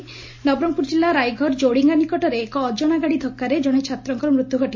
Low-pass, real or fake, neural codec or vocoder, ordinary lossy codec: 7.2 kHz; real; none; MP3, 32 kbps